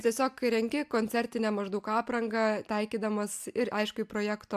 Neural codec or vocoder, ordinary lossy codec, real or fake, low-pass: none; AAC, 96 kbps; real; 14.4 kHz